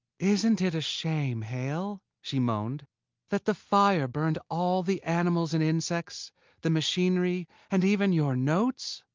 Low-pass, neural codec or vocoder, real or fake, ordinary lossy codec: 7.2 kHz; none; real; Opus, 32 kbps